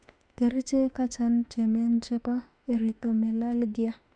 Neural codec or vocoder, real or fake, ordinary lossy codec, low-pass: autoencoder, 48 kHz, 32 numbers a frame, DAC-VAE, trained on Japanese speech; fake; none; 9.9 kHz